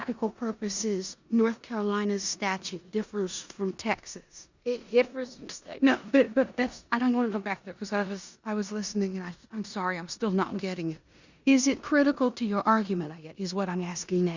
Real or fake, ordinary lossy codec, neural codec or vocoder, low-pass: fake; Opus, 64 kbps; codec, 16 kHz in and 24 kHz out, 0.9 kbps, LongCat-Audio-Codec, four codebook decoder; 7.2 kHz